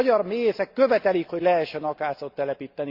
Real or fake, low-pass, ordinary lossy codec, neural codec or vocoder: real; 5.4 kHz; Opus, 64 kbps; none